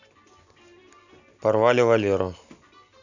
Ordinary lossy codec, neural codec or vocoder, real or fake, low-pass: none; none; real; 7.2 kHz